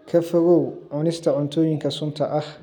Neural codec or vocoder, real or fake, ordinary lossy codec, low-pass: none; real; none; 19.8 kHz